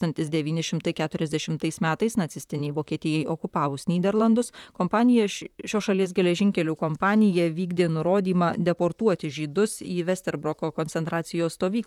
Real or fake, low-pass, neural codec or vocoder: fake; 19.8 kHz; vocoder, 44.1 kHz, 128 mel bands, Pupu-Vocoder